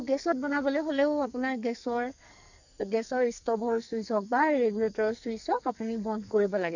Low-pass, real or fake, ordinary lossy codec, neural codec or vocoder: 7.2 kHz; fake; none; codec, 44.1 kHz, 2.6 kbps, SNAC